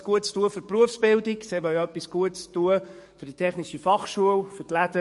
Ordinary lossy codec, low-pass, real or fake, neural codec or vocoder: MP3, 48 kbps; 14.4 kHz; fake; codec, 44.1 kHz, 7.8 kbps, DAC